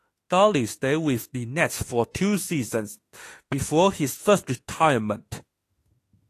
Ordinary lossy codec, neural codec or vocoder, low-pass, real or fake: AAC, 48 kbps; autoencoder, 48 kHz, 32 numbers a frame, DAC-VAE, trained on Japanese speech; 14.4 kHz; fake